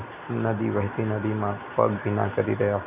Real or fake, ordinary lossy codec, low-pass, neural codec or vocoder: real; none; 3.6 kHz; none